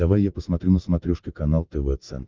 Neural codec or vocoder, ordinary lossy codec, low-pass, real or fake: none; Opus, 32 kbps; 7.2 kHz; real